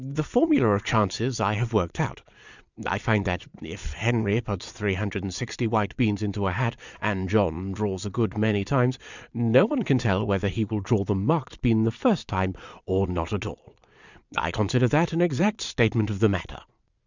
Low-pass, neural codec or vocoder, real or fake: 7.2 kHz; vocoder, 22.05 kHz, 80 mel bands, Vocos; fake